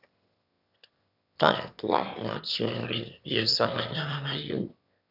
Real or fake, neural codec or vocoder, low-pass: fake; autoencoder, 22.05 kHz, a latent of 192 numbers a frame, VITS, trained on one speaker; 5.4 kHz